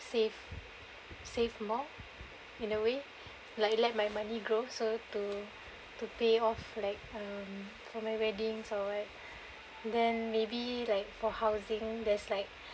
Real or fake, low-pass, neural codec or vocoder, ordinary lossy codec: real; none; none; none